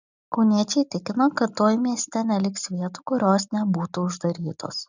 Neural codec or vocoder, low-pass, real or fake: none; 7.2 kHz; real